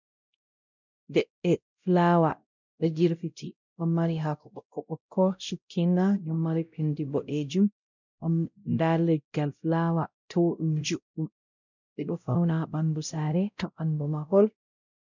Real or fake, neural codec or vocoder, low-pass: fake; codec, 16 kHz, 0.5 kbps, X-Codec, WavLM features, trained on Multilingual LibriSpeech; 7.2 kHz